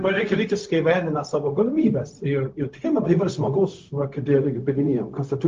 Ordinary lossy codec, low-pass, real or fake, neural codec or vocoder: Opus, 32 kbps; 7.2 kHz; fake; codec, 16 kHz, 0.4 kbps, LongCat-Audio-Codec